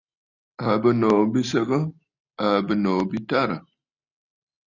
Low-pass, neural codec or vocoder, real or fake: 7.2 kHz; none; real